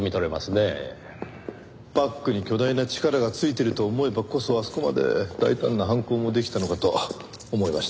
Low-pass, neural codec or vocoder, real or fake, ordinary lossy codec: none; none; real; none